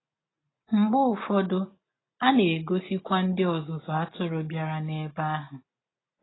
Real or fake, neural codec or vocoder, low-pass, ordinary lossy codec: real; none; 7.2 kHz; AAC, 16 kbps